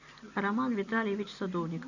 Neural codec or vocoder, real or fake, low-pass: none; real; 7.2 kHz